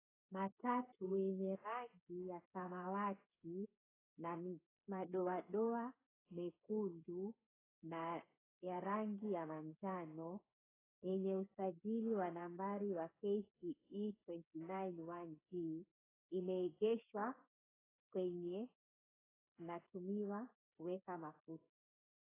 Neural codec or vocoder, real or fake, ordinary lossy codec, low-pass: codec, 16 kHz, 8 kbps, FreqCodec, smaller model; fake; AAC, 16 kbps; 3.6 kHz